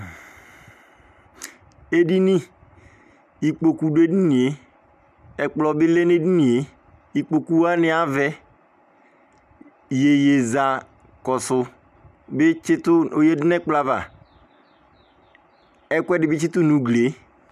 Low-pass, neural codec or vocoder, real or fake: 14.4 kHz; none; real